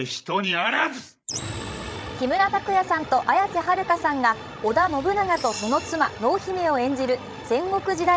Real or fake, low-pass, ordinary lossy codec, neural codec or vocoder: fake; none; none; codec, 16 kHz, 16 kbps, FreqCodec, larger model